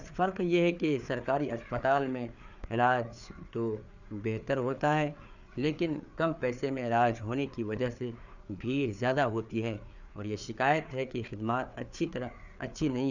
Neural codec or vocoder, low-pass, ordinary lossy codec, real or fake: codec, 16 kHz, 4 kbps, FreqCodec, larger model; 7.2 kHz; none; fake